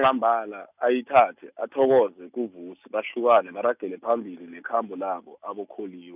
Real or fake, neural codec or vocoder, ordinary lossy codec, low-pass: real; none; none; 3.6 kHz